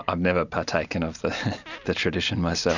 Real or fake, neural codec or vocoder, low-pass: real; none; 7.2 kHz